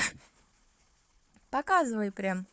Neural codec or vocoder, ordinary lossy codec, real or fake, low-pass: codec, 16 kHz, 4 kbps, FunCodec, trained on Chinese and English, 50 frames a second; none; fake; none